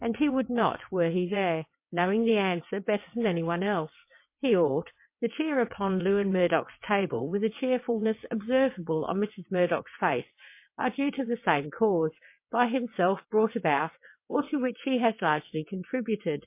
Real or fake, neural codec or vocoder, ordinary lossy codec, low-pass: fake; vocoder, 22.05 kHz, 80 mel bands, WaveNeXt; MP3, 24 kbps; 3.6 kHz